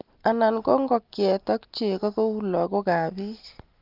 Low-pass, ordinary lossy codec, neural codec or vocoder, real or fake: 5.4 kHz; Opus, 24 kbps; none; real